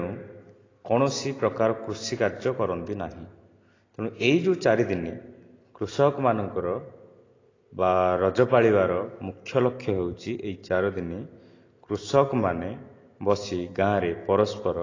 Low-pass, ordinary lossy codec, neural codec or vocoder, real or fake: 7.2 kHz; AAC, 32 kbps; none; real